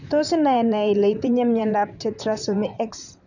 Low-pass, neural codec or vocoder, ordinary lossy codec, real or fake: 7.2 kHz; vocoder, 44.1 kHz, 128 mel bands, Pupu-Vocoder; none; fake